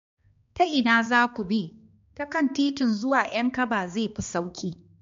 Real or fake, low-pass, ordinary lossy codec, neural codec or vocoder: fake; 7.2 kHz; MP3, 48 kbps; codec, 16 kHz, 2 kbps, X-Codec, HuBERT features, trained on balanced general audio